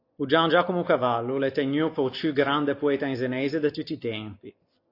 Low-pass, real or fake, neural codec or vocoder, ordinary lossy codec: 5.4 kHz; real; none; AAC, 32 kbps